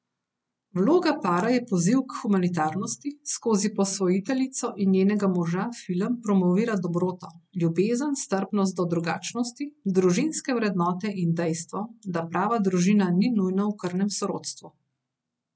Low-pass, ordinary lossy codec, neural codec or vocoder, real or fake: none; none; none; real